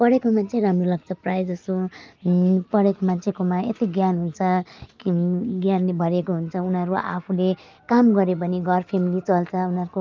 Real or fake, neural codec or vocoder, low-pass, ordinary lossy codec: real; none; 7.2 kHz; Opus, 24 kbps